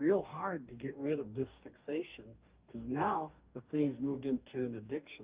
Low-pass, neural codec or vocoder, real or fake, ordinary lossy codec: 3.6 kHz; codec, 44.1 kHz, 2.6 kbps, DAC; fake; Opus, 24 kbps